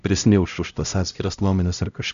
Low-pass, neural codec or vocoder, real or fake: 7.2 kHz; codec, 16 kHz, 0.5 kbps, X-Codec, HuBERT features, trained on LibriSpeech; fake